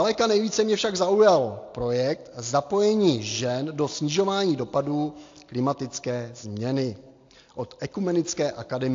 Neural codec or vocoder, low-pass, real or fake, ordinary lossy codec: none; 7.2 kHz; real; AAC, 48 kbps